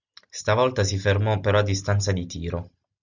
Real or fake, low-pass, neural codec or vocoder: real; 7.2 kHz; none